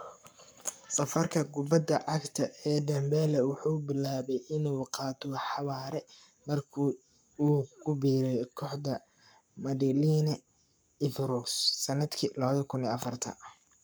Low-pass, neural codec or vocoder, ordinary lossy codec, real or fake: none; codec, 44.1 kHz, 7.8 kbps, Pupu-Codec; none; fake